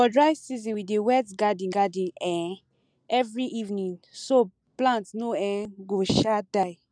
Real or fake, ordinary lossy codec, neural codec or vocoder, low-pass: real; none; none; 9.9 kHz